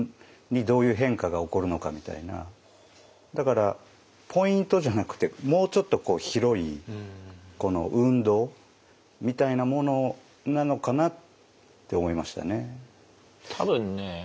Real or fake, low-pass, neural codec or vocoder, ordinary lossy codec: real; none; none; none